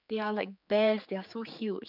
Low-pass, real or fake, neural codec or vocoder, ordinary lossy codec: 5.4 kHz; fake; codec, 16 kHz, 4 kbps, X-Codec, HuBERT features, trained on general audio; none